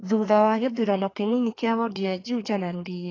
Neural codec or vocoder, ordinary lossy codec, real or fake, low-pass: codec, 32 kHz, 1.9 kbps, SNAC; AAC, 32 kbps; fake; 7.2 kHz